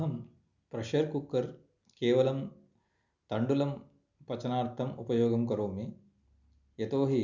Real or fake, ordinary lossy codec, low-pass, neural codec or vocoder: real; none; 7.2 kHz; none